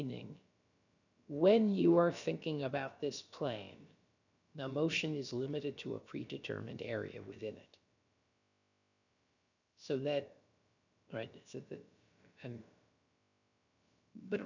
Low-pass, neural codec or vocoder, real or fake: 7.2 kHz; codec, 16 kHz, about 1 kbps, DyCAST, with the encoder's durations; fake